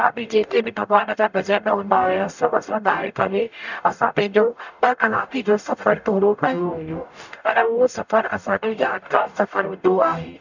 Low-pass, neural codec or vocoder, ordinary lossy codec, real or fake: 7.2 kHz; codec, 44.1 kHz, 0.9 kbps, DAC; none; fake